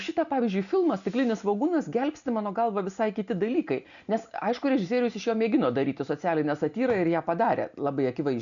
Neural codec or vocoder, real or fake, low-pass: none; real; 7.2 kHz